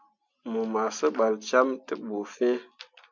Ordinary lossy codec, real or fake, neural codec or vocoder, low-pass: MP3, 64 kbps; real; none; 7.2 kHz